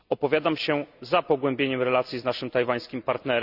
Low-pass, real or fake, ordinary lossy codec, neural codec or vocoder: 5.4 kHz; real; none; none